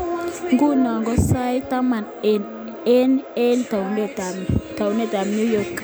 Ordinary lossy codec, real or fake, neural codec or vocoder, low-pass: none; real; none; none